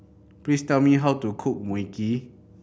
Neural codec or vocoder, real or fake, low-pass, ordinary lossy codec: none; real; none; none